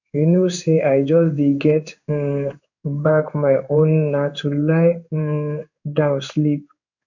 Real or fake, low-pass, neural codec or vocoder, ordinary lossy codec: fake; 7.2 kHz; codec, 16 kHz in and 24 kHz out, 1 kbps, XY-Tokenizer; none